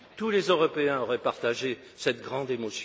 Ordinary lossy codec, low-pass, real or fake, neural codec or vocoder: none; none; real; none